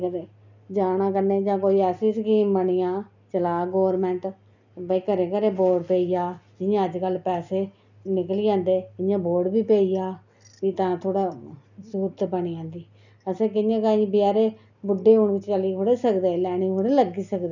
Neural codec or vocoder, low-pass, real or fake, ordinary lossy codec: none; 7.2 kHz; real; none